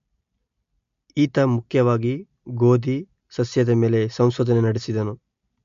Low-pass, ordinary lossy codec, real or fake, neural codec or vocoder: 7.2 kHz; MP3, 48 kbps; real; none